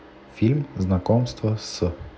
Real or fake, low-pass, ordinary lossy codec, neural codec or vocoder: real; none; none; none